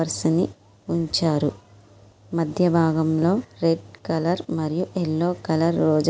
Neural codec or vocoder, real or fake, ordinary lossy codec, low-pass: none; real; none; none